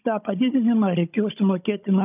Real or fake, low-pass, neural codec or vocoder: fake; 3.6 kHz; codec, 16 kHz, 16 kbps, FunCodec, trained on Chinese and English, 50 frames a second